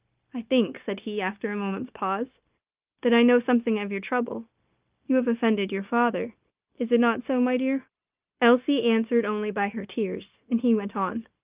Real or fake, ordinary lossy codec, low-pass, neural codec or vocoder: fake; Opus, 24 kbps; 3.6 kHz; codec, 16 kHz, 0.9 kbps, LongCat-Audio-Codec